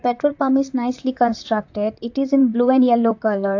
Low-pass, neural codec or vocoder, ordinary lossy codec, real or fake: 7.2 kHz; vocoder, 44.1 kHz, 128 mel bands, Pupu-Vocoder; AAC, 48 kbps; fake